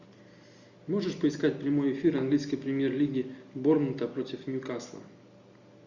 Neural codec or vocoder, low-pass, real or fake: none; 7.2 kHz; real